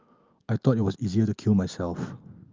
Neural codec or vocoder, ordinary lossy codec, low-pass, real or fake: none; Opus, 24 kbps; 7.2 kHz; real